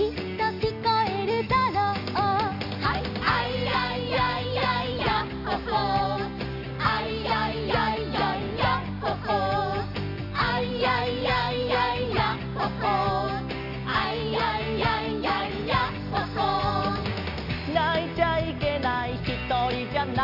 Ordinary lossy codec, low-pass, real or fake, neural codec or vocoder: none; 5.4 kHz; real; none